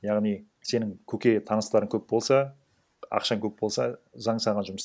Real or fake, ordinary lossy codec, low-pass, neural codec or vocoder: real; none; none; none